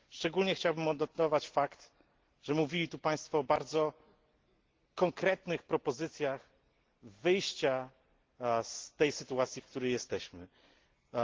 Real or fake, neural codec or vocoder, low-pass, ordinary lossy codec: real; none; 7.2 kHz; Opus, 16 kbps